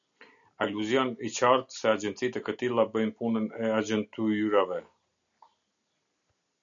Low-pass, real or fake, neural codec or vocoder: 7.2 kHz; real; none